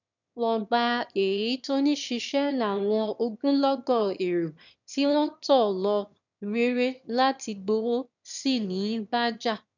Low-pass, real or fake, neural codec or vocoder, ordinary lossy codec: 7.2 kHz; fake; autoencoder, 22.05 kHz, a latent of 192 numbers a frame, VITS, trained on one speaker; none